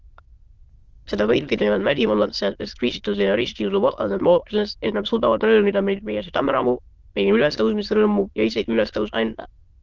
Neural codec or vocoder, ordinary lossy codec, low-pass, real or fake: autoencoder, 22.05 kHz, a latent of 192 numbers a frame, VITS, trained on many speakers; Opus, 16 kbps; 7.2 kHz; fake